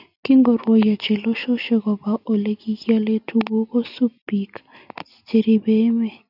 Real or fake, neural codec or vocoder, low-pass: real; none; 5.4 kHz